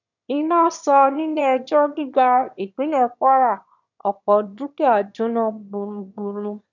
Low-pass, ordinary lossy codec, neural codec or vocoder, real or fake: 7.2 kHz; none; autoencoder, 22.05 kHz, a latent of 192 numbers a frame, VITS, trained on one speaker; fake